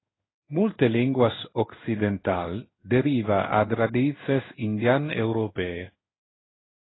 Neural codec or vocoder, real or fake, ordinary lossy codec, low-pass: codec, 16 kHz in and 24 kHz out, 1 kbps, XY-Tokenizer; fake; AAC, 16 kbps; 7.2 kHz